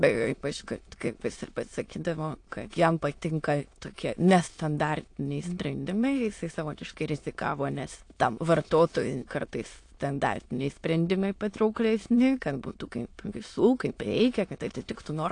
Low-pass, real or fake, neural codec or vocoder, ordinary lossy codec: 9.9 kHz; fake; autoencoder, 22.05 kHz, a latent of 192 numbers a frame, VITS, trained on many speakers; AAC, 48 kbps